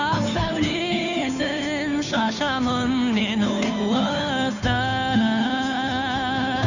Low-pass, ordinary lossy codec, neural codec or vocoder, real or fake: 7.2 kHz; none; codec, 16 kHz, 2 kbps, FunCodec, trained on Chinese and English, 25 frames a second; fake